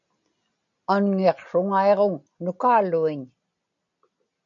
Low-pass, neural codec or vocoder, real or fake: 7.2 kHz; none; real